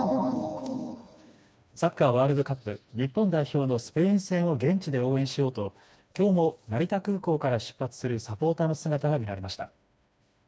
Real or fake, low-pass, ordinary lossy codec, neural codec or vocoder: fake; none; none; codec, 16 kHz, 2 kbps, FreqCodec, smaller model